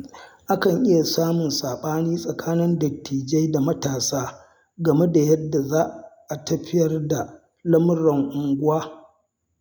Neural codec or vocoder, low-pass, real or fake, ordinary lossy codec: none; none; real; none